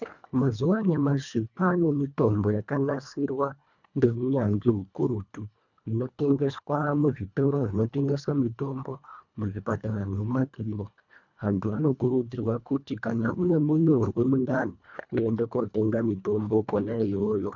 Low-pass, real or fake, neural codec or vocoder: 7.2 kHz; fake; codec, 24 kHz, 1.5 kbps, HILCodec